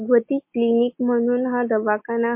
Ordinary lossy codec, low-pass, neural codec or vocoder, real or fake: MP3, 24 kbps; 3.6 kHz; none; real